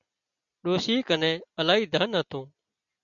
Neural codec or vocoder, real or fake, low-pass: none; real; 7.2 kHz